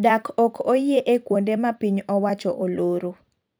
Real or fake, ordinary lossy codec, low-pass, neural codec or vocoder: fake; none; none; vocoder, 44.1 kHz, 128 mel bands every 256 samples, BigVGAN v2